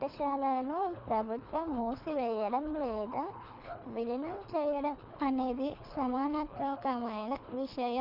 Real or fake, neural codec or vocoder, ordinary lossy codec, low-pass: fake; codec, 24 kHz, 3 kbps, HILCodec; none; 5.4 kHz